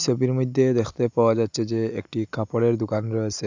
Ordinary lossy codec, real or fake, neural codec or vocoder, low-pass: AAC, 48 kbps; real; none; 7.2 kHz